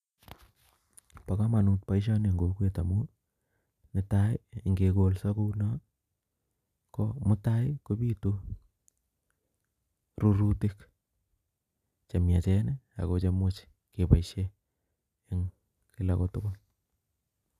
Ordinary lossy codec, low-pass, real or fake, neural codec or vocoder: none; 14.4 kHz; real; none